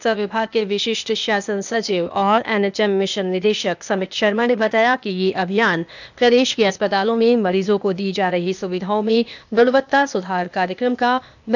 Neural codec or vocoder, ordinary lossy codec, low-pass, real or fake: codec, 16 kHz, 0.8 kbps, ZipCodec; none; 7.2 kHz; fake